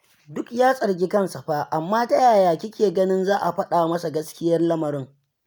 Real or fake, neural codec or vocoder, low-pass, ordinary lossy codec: real; none; none; none